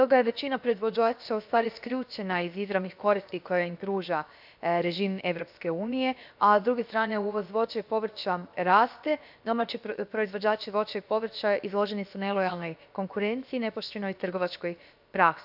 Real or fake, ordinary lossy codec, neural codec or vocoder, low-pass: fake; AAC, 48 kbps; codec, 16 kHz, about 1 kbps, DyCAST, with the encoder's durations; 5.4 kHz